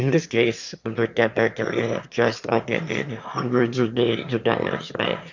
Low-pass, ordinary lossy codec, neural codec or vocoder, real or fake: 7.2 kHz; MP3, 64 kbps; autoencoder, 22.05 kHz, a latent of 192 numbers a frame, VITS, trained on one speaker; fake